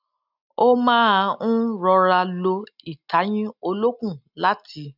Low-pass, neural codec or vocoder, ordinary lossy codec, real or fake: 5.4 kHz; none; none; real